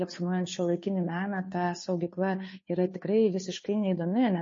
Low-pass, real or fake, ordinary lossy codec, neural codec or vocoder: 7.2 kHz; fake; MP3, 32 kbps; codec, 16 kHz, 2 kbps, FunCodec, trained on Chinese and English, 25 frames a second